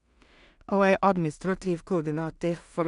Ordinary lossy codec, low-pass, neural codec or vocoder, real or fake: none; 10.8 kHz; codec, 16 kHz in and 24 kHz out, 0.4 kbps, LongCat-Audio-Codec, two codebook decoder; fake